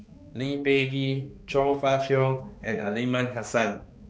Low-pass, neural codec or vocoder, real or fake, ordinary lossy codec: none; codec, 16 kHz, 2 kbps, X-Codec, HuBERT features, trained on balanced general audio; fake; none